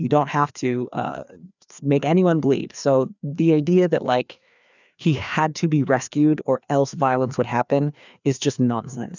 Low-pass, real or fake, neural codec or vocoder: 7.2 kHz; fake; codec, 16 kHz, 2 kbps, FreqCodec, larger model